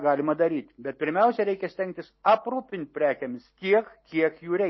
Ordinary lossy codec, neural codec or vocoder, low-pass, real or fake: MP3, 24 kbps; none; 7.2 kHz; real